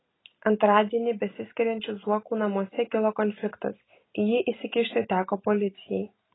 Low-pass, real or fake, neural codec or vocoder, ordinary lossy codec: 7.2 kHz; real; none; AAC, 16 kbps